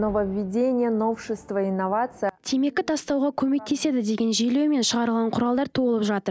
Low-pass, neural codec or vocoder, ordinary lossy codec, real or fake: none; none; none; real